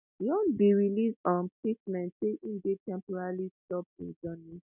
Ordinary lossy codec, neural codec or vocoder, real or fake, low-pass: none; none; real; 3.6 kHz